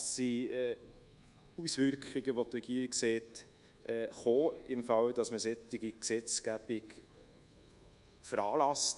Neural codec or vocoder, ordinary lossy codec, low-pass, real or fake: codec, 24 kHz, 1.2 kbps, DualCodec; none; 10.8 kHz; fake